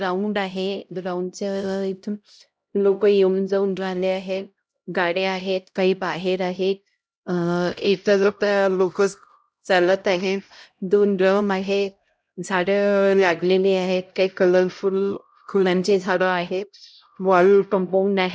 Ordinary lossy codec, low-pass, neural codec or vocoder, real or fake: none; none; codec, 16 kHz, 0.5 kbps, X-Codec, HuBERT features, trained on LibriSpeech; fake